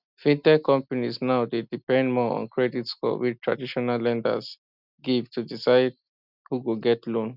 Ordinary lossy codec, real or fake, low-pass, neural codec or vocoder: none; real; 5.4 kHz; none